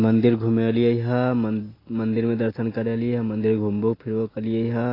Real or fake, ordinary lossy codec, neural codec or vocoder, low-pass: real; AAC, 24 kbps; none; 5.4 kHz